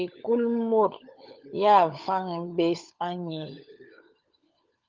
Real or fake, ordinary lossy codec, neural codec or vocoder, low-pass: fake; Opus, 32 kbps; codec, 16 kHz, 16 kbps, FunCodec, trained on LibriTTS, 50 frames a second; 7.2 kHz